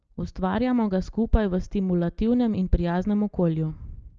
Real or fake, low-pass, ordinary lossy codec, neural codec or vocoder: real; 7.2 kHz; Opus, 24 kbps; none